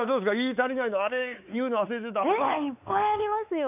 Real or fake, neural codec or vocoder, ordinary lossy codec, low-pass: fake; codec, 16 kHz, 4 kbps, X-Codec, WavLM features, trained on Multilingual LibriSpeech; none; 3.6 kHz